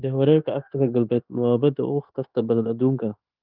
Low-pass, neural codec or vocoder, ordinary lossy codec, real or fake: 5.4 kHz; codec, 16 kHz, 0.9 kbps, LongCat-Audio-Codec; Opus, 24 kbps; fake